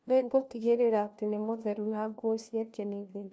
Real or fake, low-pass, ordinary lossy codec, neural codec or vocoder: fake; none; none; codec, 16 kHz, 1 kbps, FunCodec, trained on LibriTTS, 50 frames a second